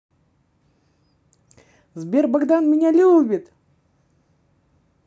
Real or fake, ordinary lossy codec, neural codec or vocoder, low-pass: real; none; none; none